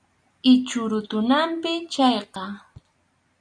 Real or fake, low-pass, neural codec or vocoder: real; 9.9 kHz; none